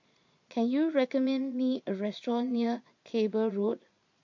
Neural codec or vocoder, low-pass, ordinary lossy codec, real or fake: vocoder, 22.05 kHz, 80 mel bands, Vocos; 7.2 kHz; MP3, 48 kbps; fake